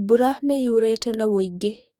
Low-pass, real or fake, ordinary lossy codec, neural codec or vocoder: 19.8 kHz; fake; none; codec, 44.1 kHz, 2.6 kbps, DAC